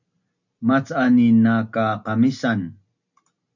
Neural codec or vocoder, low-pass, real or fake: none; 7.2 kHz; real